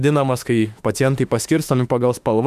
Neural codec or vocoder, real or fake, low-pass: autoencoder, 48 kHz, 32 numbers a frame, DAC-VAE, trained on Japanese speech; fake; 14.4 kHz